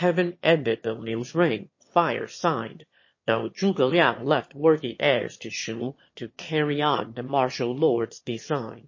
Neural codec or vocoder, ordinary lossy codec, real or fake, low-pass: autoencoder, 22.05 kHz, a latent of 192 numbers a frame, VITS, trained on one speaker; MP3, 32 kbps; fake; 7.2 kHz